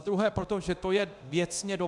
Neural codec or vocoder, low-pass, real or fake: codec, 24 kHz, 0.9 kbps, DualCodec; 10.8 kHz; fake